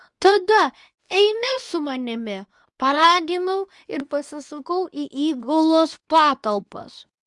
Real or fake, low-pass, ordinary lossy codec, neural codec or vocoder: fake; 10.8 kHz; Opus, 64 kbps; codec, 24 kHz, 0.9 kbps, WavTokenizer, medium speech release version 2